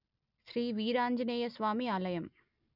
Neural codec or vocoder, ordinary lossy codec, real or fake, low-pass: none; none; real; 5.4 kHz